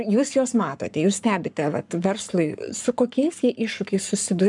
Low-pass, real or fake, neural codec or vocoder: 10.8 kHz; fake; codec, 44.1 kHz, 7.8 kbps, Pupu-Codec